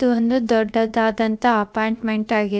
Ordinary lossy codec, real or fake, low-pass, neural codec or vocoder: none; fake; none; codec, 16 kHz, about 1 kbps, DyCAST, with the encoder's durations